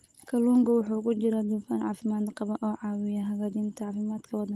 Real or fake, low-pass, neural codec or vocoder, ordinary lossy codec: real; 14.4 kHz; none; Opus, 24 kbps